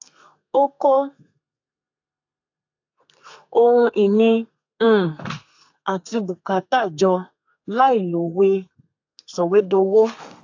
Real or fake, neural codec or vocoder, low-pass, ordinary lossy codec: fake; codec, 32 kHz, 1.9 kbps, SNAC; 7.2 kHz; AAC, 48 kbps